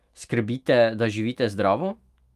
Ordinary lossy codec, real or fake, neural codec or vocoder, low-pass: Opus, 32 kbps; fake; autoencoder, 48 kHz, 128 numbers a frame, DAC-VAE, trained on Japanese speech; 14.4 kHz